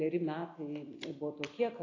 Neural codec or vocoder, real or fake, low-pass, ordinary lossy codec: none; real; 7.2 kHz; AAC, 32 kbps